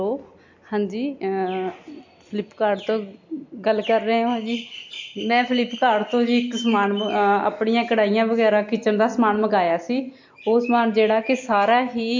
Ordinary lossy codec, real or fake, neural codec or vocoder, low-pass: MP3, 48 kbps; real; none; 7.2 kHz